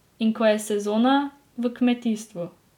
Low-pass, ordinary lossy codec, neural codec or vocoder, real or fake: 19.8 kHz; none; none; real